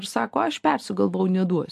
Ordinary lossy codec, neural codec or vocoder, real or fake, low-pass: MP3, 64 kbps; none; real; 14.4 kHz